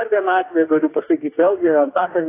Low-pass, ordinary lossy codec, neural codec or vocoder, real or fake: 3.6 kHz; AAC, 24 kbps; codec, 44.1 kHz, 2.6 kbps, DAC; fake